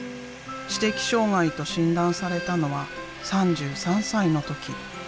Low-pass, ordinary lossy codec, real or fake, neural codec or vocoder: none; none; real; none